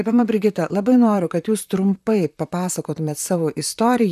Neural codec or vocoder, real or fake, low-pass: vocoder, 44.1 kHz, 128 mel bands, Pupu-Vocoder; fake; 14.4 kHz